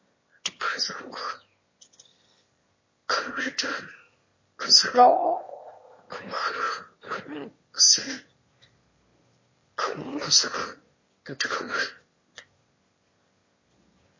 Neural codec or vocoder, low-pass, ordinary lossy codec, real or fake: autoencoder, 22.05 kHz, a latent of 192 numbers a frame, VITS, trained on one speaker; 7.2 kHz; MP3, 32 kbps; fake